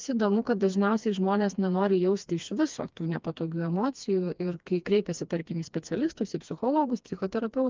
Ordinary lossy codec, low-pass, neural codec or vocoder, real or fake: Opus, 24 kbps; 7.2 kHz; codec, 16 kHz, 2 kbps, FreqCodec, smaller model; fake